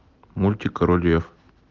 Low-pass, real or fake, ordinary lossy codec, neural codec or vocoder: 7.2 kHz; real; Opus, 32 kbps; none